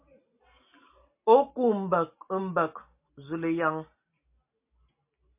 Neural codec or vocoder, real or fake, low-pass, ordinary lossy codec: none; real; 3.6 kHz; MP3, 24 kbps